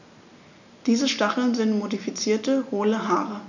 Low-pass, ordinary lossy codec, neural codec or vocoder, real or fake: 7.2 kHz; none; none; real